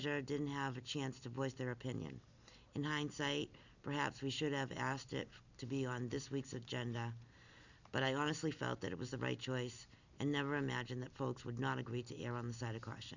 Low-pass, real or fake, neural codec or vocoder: 7.2 kHz; real; none